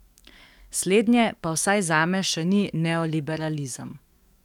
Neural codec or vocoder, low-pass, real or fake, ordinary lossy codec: codec, 44.1 kHz, 7.8 kbps, DAC; 19.8 kHz; fake; none